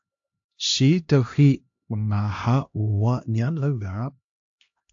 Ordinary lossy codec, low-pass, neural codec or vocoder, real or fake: AAC, 48 kbps; 7.2 kHz; codec, 16 kHz, 1 kbps, X-Codec, HuBERT features, trained on LibriSpeech; fake